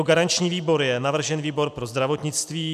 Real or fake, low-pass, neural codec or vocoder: real; 14.4 kHz; none